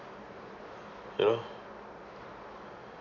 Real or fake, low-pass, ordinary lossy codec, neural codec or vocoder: real; 7.2 kHz; none; none